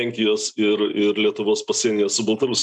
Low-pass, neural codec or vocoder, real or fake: 10.8 kHz; none; real